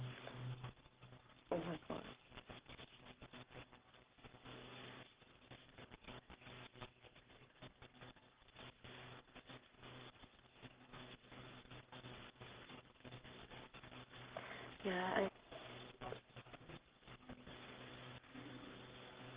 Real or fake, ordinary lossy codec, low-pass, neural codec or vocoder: fake; Opus, 16 kbps; 3.6 kHz; codec, 44.1 kHz, 7.8 kbps, Pupu-Codec